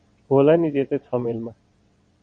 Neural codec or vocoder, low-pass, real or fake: vocoder, 22.05 kHz, 80 mel bands, WaveNeXt; 9.9 kHz; fake